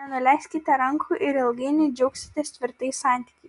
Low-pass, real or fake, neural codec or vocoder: 10.8 kHz; real; none